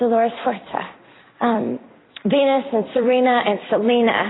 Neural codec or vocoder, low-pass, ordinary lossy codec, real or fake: vocoder, 44.1 kHz, 128 mel bands every 256 samples, BigVGAN v2; 7.2 kHz; AAC, 16 kbps; fake